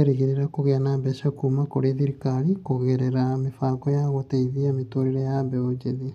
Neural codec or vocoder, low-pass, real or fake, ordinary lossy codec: none; 14.4 kHz; real; none